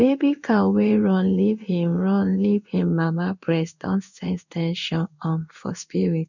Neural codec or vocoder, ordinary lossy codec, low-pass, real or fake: codec, 16 kHz in and 24 kHz out, 1 kbps, XY-Tokenizer; none; 7.2 kHz; fake